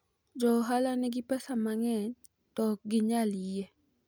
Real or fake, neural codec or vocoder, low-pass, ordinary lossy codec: real; none; none; none